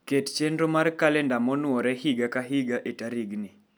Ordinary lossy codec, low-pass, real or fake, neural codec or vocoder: none; none; real; none